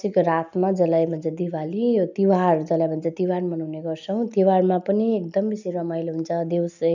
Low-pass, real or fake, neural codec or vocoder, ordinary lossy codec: 7.2 kHz; real; none; none